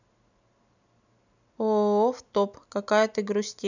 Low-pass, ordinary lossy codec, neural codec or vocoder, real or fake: 7.2 kHz; none; none; real